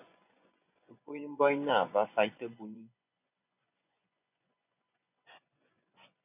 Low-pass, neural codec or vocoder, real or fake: 3.6 kHz; vocoder, 24 kHz, 100 mel bands, Vocos; fake